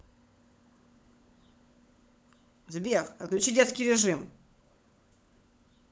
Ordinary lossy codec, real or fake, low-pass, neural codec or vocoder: none; fake; none; codec, 16 kHz, 16 kbps, FunCodec, trained on LibriTTS, 50 frames a second